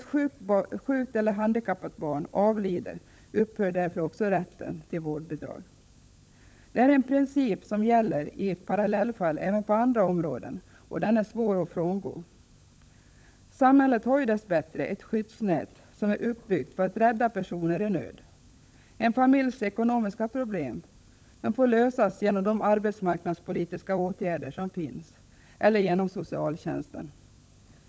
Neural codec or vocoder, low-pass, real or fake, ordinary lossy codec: codec, 16 kHz, 16 kbps, FunCodec, trained on LibriTTS, 50 frames a second; none; fake; none